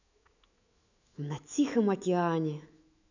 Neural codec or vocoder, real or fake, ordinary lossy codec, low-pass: autoencoder, 48 kHz, 128 numbers a frame, DAC-VAE, trained on Japanese speech; fake; none; 7.2 kHz